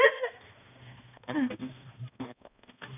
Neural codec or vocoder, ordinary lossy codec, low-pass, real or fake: codec, 16 kHz, 1 kbps, X-Codec, HuBERT features, trained on balanced general audio; none; 3.6 kHz; fake